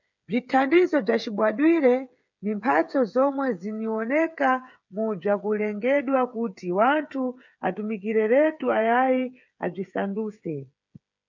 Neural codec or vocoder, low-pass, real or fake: codec, 16 kHz, 8 kbps, FreqCodec, smaller model; 7.2 kHz; fake